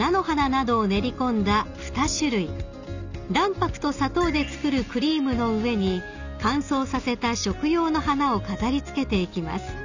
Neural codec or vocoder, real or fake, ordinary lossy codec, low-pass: none; real; none; 7.2 kHz